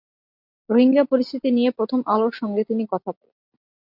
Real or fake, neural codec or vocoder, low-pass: real; none; 5.4 kHz